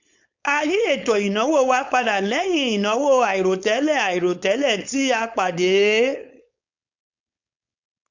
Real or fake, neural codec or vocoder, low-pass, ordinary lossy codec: fake; codec, 16 kHz, 4.8 kbps, FACodec; 7.2 kHz; none